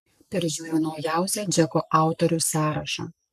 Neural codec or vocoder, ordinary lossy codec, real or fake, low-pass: codec, 44.1 kHz, 7.8 kbps, Pupu-Codec; MP3, 96 kbps; fake; 14.4 kHz